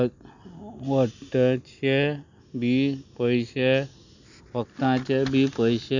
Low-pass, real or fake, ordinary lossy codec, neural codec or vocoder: 7.2 kHz; real; none; none